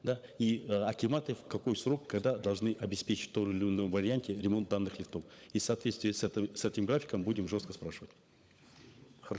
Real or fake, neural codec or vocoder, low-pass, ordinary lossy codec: fake; codec, 16 kHz, 16 kbps, FunCodec, trained on LibriTTS, 50 frames a second; none; none